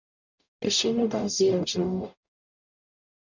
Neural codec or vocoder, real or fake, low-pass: codec, 44.1 kHz, 0.9 kbps, DAC; fake; 7.2 kHz